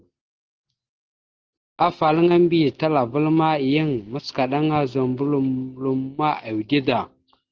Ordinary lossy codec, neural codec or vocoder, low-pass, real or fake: Opus, 16 kbps; none; 7.2 kHz; real